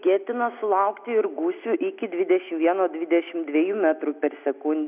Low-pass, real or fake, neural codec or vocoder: 3.6 kHz; real; none